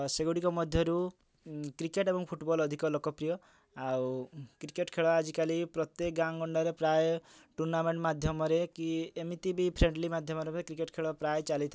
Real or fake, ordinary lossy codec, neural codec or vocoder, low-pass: real; none; none; none